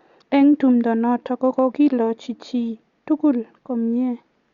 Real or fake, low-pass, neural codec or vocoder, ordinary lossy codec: real; 7.2 kHz; none; Opus, 64 kbps